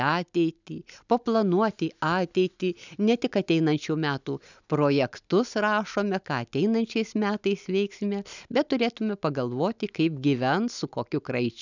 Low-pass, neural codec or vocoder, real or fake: 7.2 kHz; none; real